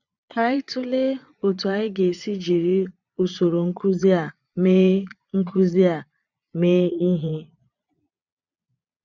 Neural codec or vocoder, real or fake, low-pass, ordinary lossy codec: vocoder, 22.05 kHz, 80 mel bands, Vocos; fake; 7.2 kHz; none